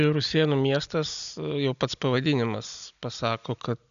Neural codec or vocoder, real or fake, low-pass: none; real; 7.2 kHz